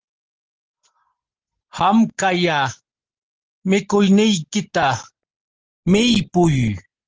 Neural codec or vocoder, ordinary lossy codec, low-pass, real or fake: none; Opus, 16 kbps; 7.2 kHz; real